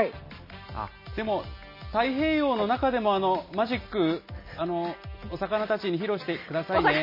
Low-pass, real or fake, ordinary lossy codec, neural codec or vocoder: 5.4 kHz; real; MP3, 24 kbps; none